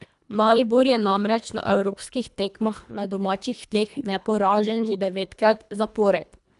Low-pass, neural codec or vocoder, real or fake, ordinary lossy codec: 10.8 kHz; codec, 24 kHz, 1.5 kbps, HILCodec; fake; none